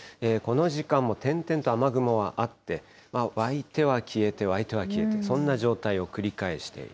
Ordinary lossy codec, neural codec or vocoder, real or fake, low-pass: none; none; real; none